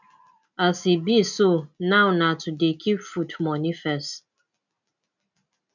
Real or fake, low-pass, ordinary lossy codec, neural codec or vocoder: real; 7.2 kHz; none; none